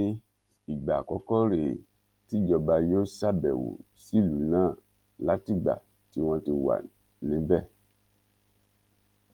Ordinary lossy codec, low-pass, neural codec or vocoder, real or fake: Opus, 24 kbps; 19.8 kHz; none; real